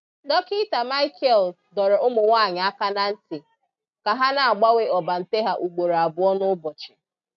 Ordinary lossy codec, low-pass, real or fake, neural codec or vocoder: MP3, 64 kbps; 7.2 kHz; real; none